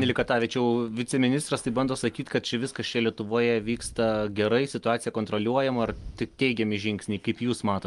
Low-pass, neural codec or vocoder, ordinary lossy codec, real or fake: 10.8 kHz; none; Opus, 32 kbps; real